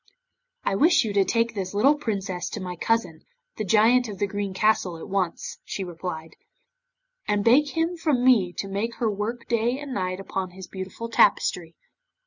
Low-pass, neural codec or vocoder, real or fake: 7.2 kHz; none; real